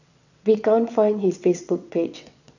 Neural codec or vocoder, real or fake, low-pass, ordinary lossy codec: vocoder, 22.05 kHz, 80 mel bands, WaveNeXt; fake; 7.2 kHz; none